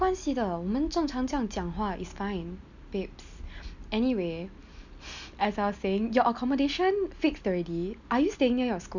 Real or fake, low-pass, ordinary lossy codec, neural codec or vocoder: real; 7.2 kHz; none; none